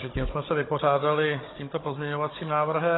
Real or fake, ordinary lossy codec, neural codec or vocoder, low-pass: fake; AAC, 16 kbps; codec, 16 kHz, 16 kbps, FunCodec, trained on Chinese and English, 50 frames a second; 7.2 kHz